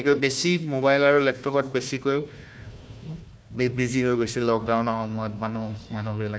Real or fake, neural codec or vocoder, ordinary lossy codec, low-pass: fake; codec, 16 kHz, 1 kbps, FunCodec, trained on Chinese and English, 50 frames a second; none; none